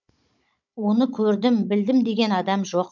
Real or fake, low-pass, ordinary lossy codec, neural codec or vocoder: fake; 7.2 kHz; none; codec, 16 kHz, 16 kbps, FunCodec, trained on Chinese and English, 50 frames a second